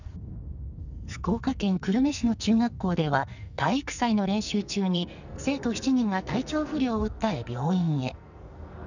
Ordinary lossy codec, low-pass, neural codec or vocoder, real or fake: none; 7.2 kHz; codec, 44.1 kHz, 2.6 kbps, SNAC; fake